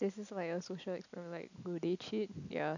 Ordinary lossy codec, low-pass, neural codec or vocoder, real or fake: none; 7.2 kHz; none; real